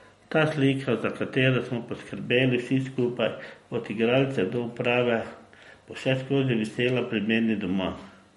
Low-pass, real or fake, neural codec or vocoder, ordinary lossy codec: 19.8 kHz; real; none; MP3, 48 kbps